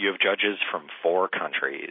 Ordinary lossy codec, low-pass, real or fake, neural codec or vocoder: MP3, 24 kbps; 5.4 kHz; real; none